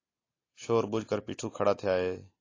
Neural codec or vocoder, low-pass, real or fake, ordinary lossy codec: none; 7.2 kHz; real; AAC, 32 kbps